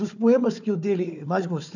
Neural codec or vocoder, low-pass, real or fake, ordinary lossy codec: codec, 16 kHz, 4 kbps, FunCodec, trained on Chinese and English, 50 frames a second; 7.2 kHz; fake; none